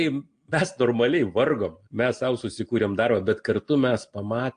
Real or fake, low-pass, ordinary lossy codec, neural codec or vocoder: real; 9.9 kHz; AAC, 48 kbps; none